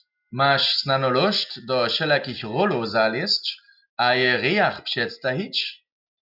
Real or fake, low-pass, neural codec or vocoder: real; 5.4 kHz; none